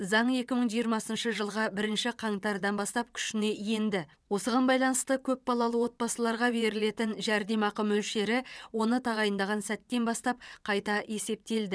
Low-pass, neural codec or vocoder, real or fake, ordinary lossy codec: none; vocoder, 22.05 kHz, 80 mel bands, WaveNeXt; fake; none